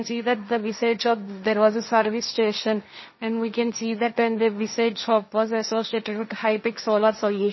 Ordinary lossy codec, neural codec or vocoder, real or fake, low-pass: MP3, 24 kbps; codec, 16 kHz, 1.1 kbps, Voila-Tokenizer; fake; 7.2 kHz